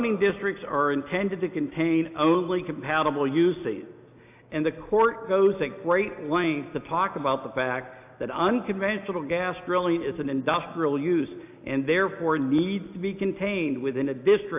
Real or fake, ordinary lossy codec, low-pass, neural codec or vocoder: real; AAC, 32 kbps; 3.6 kHz; none